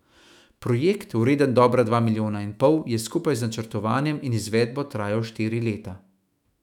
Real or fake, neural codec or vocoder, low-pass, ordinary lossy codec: fake; autoencoder, 48 kHz, 128 numbers a frame, DAC-VAE, trained on Japanese speech; 19.8 kHz; none